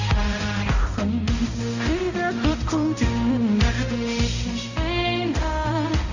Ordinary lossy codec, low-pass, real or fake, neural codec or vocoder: Opus, 64 kbps; 7.2 kHz; fake; codec, 16 kHz, 0.5 kbps, X-Codec, HuBERT features, trained on balanced general audio